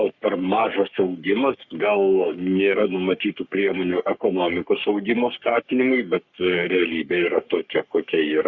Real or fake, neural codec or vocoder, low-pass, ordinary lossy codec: fake; codec, 44.1 kHz, 3.4 kbps, Pupu-Codec; 7.2 kHz; Opus, 64 kbps